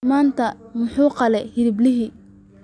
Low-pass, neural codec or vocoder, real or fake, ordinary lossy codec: 9.9 kHz; none; real; none